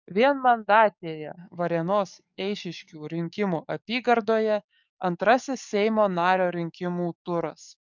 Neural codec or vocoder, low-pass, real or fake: codec, 44.1 kHz, 7.8 kbps, DAC; 7.2 kHz; fake